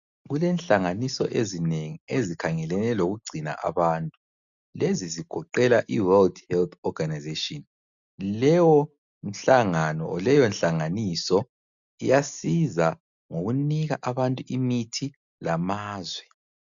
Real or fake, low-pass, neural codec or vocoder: real; 7.2 kHz; none